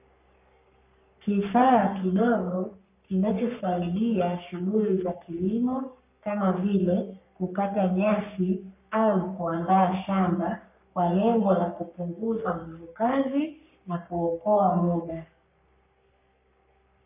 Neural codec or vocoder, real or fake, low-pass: codec, 44.1 kHz, 3.4 kbps, Pupu-Codec; fake; 3.6 kHz